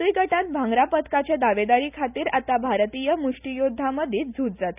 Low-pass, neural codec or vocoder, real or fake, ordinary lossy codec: 3.6 kHz; none; real; none